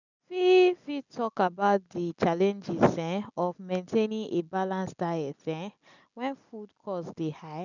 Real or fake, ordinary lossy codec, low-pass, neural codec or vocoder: real; none; 7.2 kHz; none